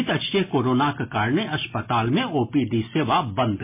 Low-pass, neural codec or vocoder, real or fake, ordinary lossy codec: 3.6 kHz; none; real; MP3, 24 kbps